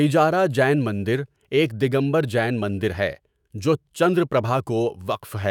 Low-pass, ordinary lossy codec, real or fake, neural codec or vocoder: 19.8 kHz; none; fake; autoencoder, 48 kHz, 128 numbers a frame, DAC-VAE, trained on Japanese speech